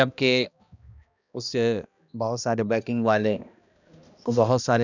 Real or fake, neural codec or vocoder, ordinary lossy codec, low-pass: fake; codec, 16 kHz, 1 kbps, X-Codec, HuBERT features, trained on balanced general audio; none; 7.2 kHz